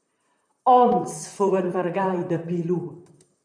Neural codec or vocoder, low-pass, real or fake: vocoder, 44.1 kHz, 128 mel bands, Pupu-Vocoder; 9.9 kHz; fake